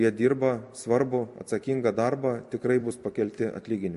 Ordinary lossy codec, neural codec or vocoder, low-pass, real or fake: MP3, 48 kbps; vocoder, 44.1 kHz, 128 mel bands every 256 samples, BigVGAN v2; 14.4 kHz; fake